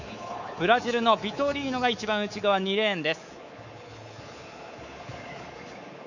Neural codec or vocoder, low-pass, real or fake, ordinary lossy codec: codec, 24 kHz, 3.1 kbps, DualCodec; 7.2 kHz; fake; none